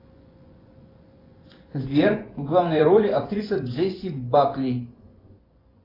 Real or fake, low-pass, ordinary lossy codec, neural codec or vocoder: fake; 5.4 kHz; AAC, 24 kbps; vocoder, 24 kHz, 100 mel bands, Vocos